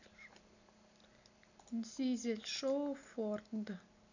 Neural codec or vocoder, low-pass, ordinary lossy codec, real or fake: none; 7.2 kHz; none; real